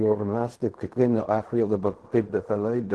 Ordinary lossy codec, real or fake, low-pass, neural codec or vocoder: Opus, 16 kbps; fake; 10.8 kHz; codec, 16 kHz in and 24 kHz out, 0.4 kbps, LongCat-Audio-Codec, fine tuned four codebook decoder